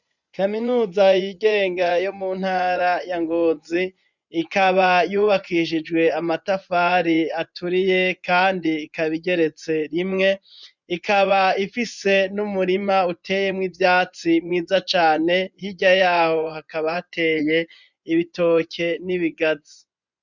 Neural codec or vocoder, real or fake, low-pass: vocoder, 24 kHz, 100 mel bands, Vocos; fake; 7.2 kHz